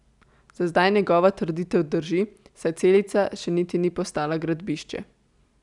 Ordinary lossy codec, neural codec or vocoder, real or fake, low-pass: none; none; real; 10.8 kHz